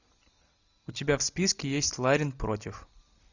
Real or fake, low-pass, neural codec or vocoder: real; 7.2 kHz; none